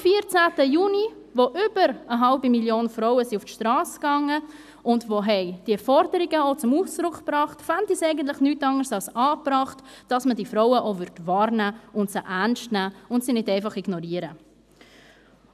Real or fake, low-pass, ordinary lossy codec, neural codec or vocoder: real; 14.4 kHz; none; none